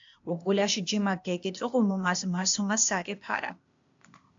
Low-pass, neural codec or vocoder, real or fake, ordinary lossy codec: 7.2 kHz; codec, 16 kHz, 0.8 kbps, ZipCodec; fake; AAC, 64 kbps